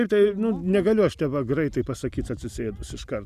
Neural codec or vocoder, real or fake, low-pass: none; real; 14.4 kHz